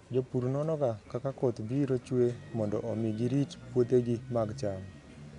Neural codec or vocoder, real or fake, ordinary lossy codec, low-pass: none; real; none; 10.8 kHz